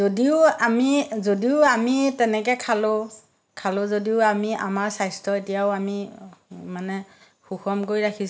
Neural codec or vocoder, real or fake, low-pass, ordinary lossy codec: none; real; none; none